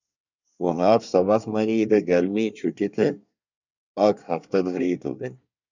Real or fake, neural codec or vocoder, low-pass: fake; codec, 24 kHz, 1 kbps, SNAC; 7.2 kHz